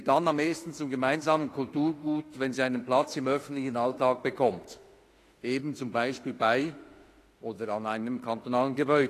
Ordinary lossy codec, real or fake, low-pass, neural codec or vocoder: AAC, 48 kbps; fake; 14.4 kHz; autoencoder, 48 kHz, 32 numbers a frame, DAC-VAE, trained on Japanese speech